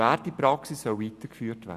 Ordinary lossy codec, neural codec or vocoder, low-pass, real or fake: Opus, 64 kbps; none; 14.4 kHz; real